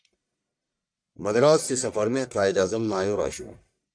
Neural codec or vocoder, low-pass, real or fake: codec, 44.1 kHz, 1.7 kbps, Pupu-Codec; 9.9 kHz; fake